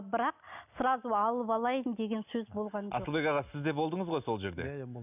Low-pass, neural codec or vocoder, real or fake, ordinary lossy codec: 3.6 kHz; none; real; none